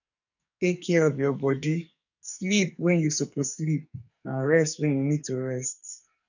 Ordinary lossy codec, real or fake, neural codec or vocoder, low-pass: none; fake; codec, 44.1 kHz, 2.6 kbps, SNAC; 7.2 kHz